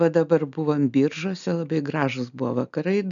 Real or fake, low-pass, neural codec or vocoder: real; 7.2 kHz; none